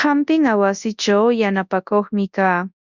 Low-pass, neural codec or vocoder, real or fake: 7.2 kHz; codec, 24 kHz, 0.9 kbps, WavTokenizer, large speech release; fake